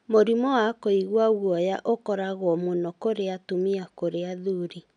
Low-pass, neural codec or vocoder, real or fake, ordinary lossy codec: 9.9 kHz; none; real; none